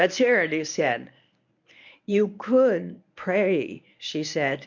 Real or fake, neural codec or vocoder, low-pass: fake; codec, 24 kHz, 0.9 kbps, WavTokenizer, medium speech release version 1; 7.2 kHz